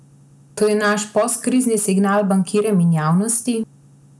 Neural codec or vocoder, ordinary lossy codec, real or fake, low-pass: none; none; real; none